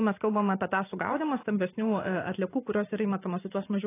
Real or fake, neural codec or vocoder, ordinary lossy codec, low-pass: fake; vocoder, 44.1 kHz, 128 mel bands every 512 samples, BigVGAN v2; AAC, 16 kbps; 3.6 kHz